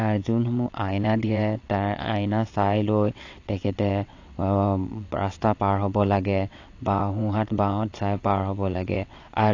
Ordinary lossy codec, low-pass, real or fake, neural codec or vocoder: AAC, 48 kbps; 7.2 kHz; fake; vocoder, 22.05 kHz, 80 mel bands, WaveNeXt